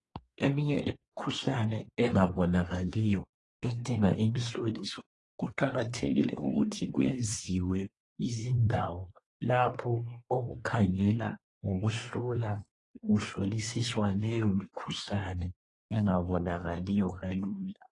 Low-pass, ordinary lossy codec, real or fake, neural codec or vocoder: 10.8 kHz; AAC, 32 kbps; fake; codec, 24 kHz, 1 kbps, SNAC